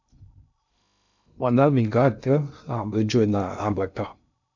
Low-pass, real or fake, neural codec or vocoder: 7.2 kHz; fake; codec, 16 kHz in and 24 kHz out, 0.6 kbps, FocalCodec, streaming, 2048 codes